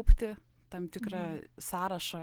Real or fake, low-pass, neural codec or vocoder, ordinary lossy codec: real; 19.8 kHz; none; Opus, 16 kbps